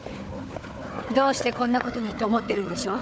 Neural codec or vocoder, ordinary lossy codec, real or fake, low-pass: codec, 16 kHz, 4 kbps, FunCodec, trained on Chinese and English, 50 frames a second; none; fake; none